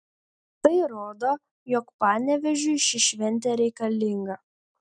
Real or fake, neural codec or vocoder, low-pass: real; none; 9.9 kHz